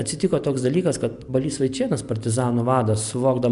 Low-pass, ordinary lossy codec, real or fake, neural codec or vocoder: 10.8 kHz; MP3, 96 kbps; real; none